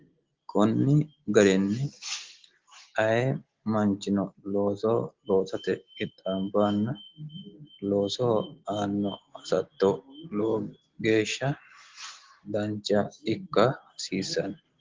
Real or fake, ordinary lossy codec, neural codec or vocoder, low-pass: real; Opus, 16 kbps; none; 7.2 kHz